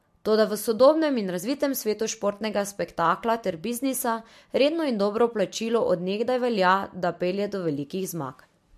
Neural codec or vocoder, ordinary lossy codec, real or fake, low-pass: none; MP3, 64 kbps; real; 14.4 kHz